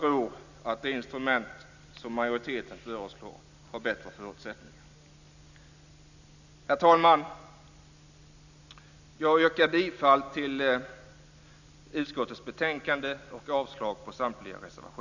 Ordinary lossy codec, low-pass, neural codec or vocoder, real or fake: none; 7.2 kHz; none; real